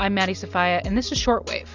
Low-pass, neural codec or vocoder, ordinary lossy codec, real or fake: 7.2 kHz; none; Opus, 64 kbps; real